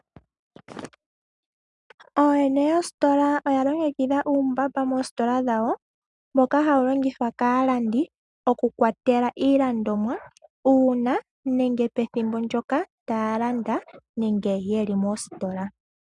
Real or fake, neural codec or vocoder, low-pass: real; none; 10.8 kHz